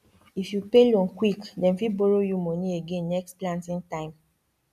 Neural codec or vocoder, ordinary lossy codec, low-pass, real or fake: none; none; 14.4 kHz; real